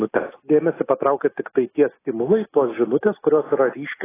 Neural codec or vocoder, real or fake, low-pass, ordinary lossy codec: none; real; 3.6 kHz; AAC, 16 kbps